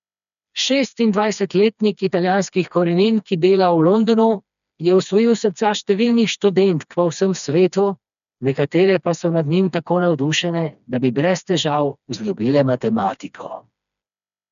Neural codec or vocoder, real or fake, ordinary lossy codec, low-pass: codec, 16 kHz, 2 kbps, FreqCodec, smaller model; fake; none; 7.2 kHz